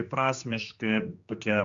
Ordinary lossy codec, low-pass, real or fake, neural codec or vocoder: Opus, 64 kbps; 7.2 kHz; fake; codec, 16 kHz, 2 kbps, X-Codec, HuBERT features, trained on general audio